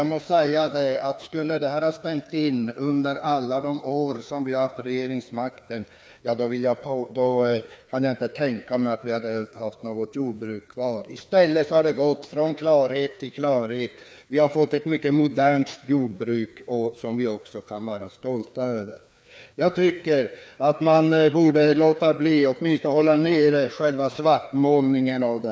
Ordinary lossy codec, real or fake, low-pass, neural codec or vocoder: none; fake; none; codec, 16 kHz, 2 kbps, FreqCodec, larger model